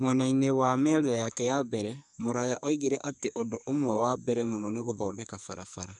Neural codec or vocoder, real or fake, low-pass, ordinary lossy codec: codec, 44.1 kHz, 2.6 kbps, SNAC; fake; 10.8 kHz; none